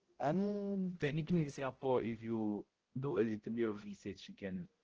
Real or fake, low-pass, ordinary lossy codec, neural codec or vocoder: fake; 7.2 kHz; Opus, 16 kbps; codec, 16 kHz, 0.5 kbps, X-Codec, HuBERT features, trained on balanced general audio